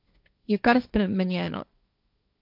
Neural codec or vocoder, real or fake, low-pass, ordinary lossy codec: codec, 16 kHz, 1.1 kbps, Voila-Tokenizer; fake; 5.4 kHz; none